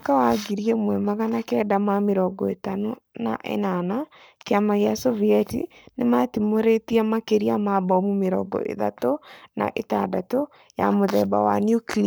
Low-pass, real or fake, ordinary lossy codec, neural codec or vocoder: none; fake; none; codec, 44.1 kHz, 7.8 kbps, Pupu-Codec